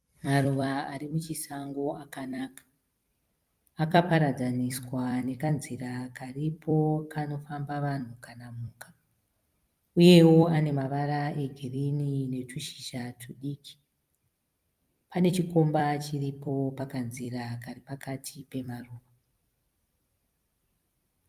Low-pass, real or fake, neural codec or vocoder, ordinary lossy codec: 14.4 kHz; fake; vocoder, 44.1 kHz, 128 mel bands every 256 samples, BigVGAN v2; Opus, 32 kbps